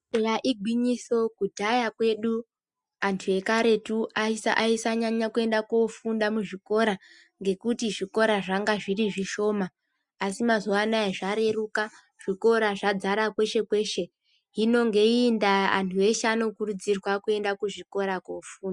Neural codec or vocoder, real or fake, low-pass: none; real; 10.8 kHz